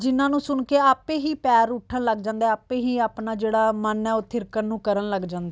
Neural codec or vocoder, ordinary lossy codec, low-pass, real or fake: none; none; none; real